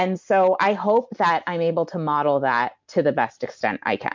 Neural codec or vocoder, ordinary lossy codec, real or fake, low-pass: none; MP3, 64 kbps; real; 7.2 kHz